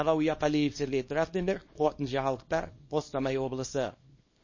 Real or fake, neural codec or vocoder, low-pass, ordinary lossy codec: fake; codec, 24 kHz, 0.9 kbps, WavTokenizer, small release; 7.2 kHz; MP3, 32 kbps